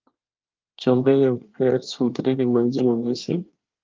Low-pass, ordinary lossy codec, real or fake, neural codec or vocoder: 7.2 kHz; Opus, 24 kbps; fake; codec, 24 kHz, 1 kbps, SNAC